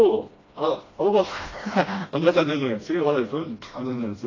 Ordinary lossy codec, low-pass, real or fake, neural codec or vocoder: AAC, 32 kbps; 7.2 kHz; fake; codec, 16 kHz, 1 kbps, FreqCodec, smaller model